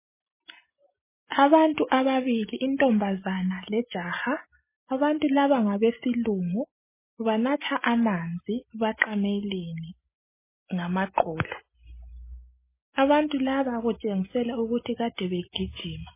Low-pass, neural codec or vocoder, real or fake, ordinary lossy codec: 3.6 kHz; none; real; MP3, 16 kbps